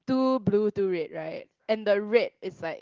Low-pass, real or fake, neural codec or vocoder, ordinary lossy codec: 7.2 kHz; real; none; Opus, 16 kbps